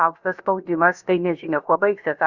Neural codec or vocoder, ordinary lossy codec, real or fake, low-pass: codec, 16 kHz, about 1 kbps, DyCAST, with the encoder's durations; Opus, 64 kbps; fake; 7.2 kHz